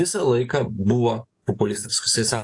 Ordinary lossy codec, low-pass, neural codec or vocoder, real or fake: AAC, 48 kbps; 10.8 kHz; codec, 44.1 kHz, 7.8 kbps, DAC; fake